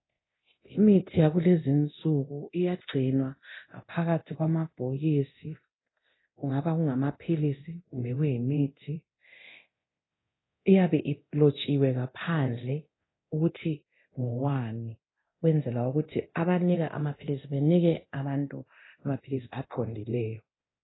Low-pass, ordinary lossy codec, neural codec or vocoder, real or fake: 7.2 kHz; AAC, 16 kbps; codec, 24 kHz, 0.9 kbps, DualCodec; fake